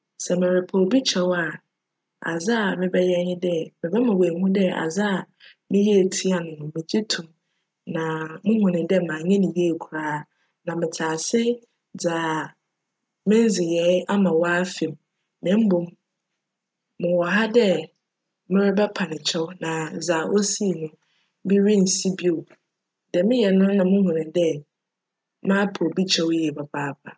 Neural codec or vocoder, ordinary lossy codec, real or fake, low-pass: none; none; real; none